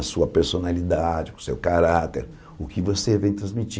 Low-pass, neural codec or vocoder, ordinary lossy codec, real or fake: none; none; none; real